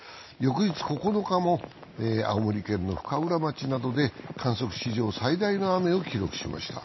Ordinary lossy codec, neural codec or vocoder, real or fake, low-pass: MP3, 24 kbps; none; real; 7.2 kHz